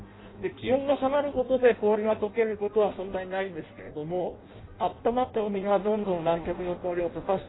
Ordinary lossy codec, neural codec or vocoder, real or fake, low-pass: AAC, 16 kbps; codec, 16 kHz in and 24 kHz out, 0.6 kbps, FireRedTTS-2 codec; fake; 7.2 kHz